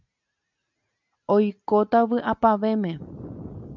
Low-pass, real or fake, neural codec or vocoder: 7.2 kHz; real; none